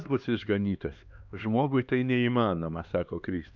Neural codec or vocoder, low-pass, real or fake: codec, 16 kHz, 2 kbps, X-Codec, HuBERT features, trained on LibriSpeech; 7.2 kHz; fake